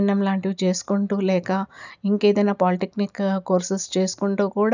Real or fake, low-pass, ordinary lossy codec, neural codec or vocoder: real; 7.2 kHz; none; none